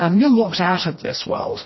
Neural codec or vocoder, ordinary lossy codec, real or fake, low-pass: codec, 16 kHz, 1 kbps, FreqCodec, smaller model; MP3, 24 kbps; fake; 7.2 kHz